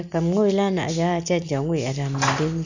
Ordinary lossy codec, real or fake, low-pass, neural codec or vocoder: none; real; 7.2 kHz; none